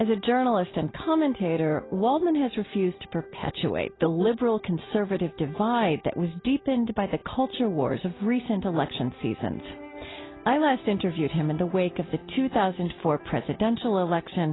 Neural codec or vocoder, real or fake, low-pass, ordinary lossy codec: none; real; 7.2 kHz; AAC, 16 kbps